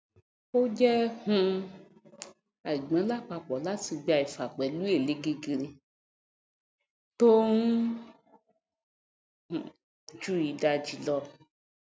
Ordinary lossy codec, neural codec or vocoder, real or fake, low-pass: none; none; real; none